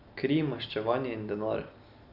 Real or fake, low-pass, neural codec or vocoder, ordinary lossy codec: real; 5.4 kHz; none; none